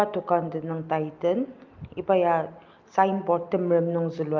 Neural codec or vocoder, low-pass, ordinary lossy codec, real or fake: none; 7.2 kHz; Opus, 24 kbps; real